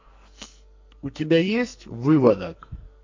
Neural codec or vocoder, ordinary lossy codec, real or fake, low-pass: codec, 44.1 kHz, 2.6 kbps, SNAC; MP3, 48 kbps; fake; 7.2 kHz